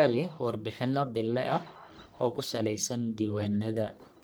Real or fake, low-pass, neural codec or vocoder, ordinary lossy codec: fake; none; codec, 44.1 kHz, 1.7 kbps, Pupu-Codec; none